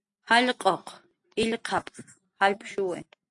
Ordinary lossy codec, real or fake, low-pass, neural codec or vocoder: AAC, 64 kbps; real; 10.8 kHz; none